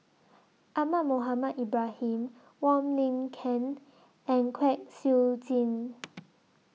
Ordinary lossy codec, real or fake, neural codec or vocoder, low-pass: none; real; none; none